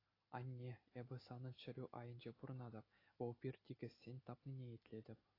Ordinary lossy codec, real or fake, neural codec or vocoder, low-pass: AAC, 24 kbps; real; none; 5.4 kHz